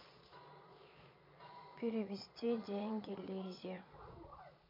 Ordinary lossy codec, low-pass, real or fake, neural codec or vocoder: MP3, 48 kbps; 5.4 kHz; fake; vocoder, 22.05 kHz, 80 mel bands, Vocos